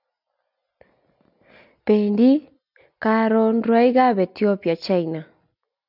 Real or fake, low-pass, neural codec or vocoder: real; 5.4 kHz; none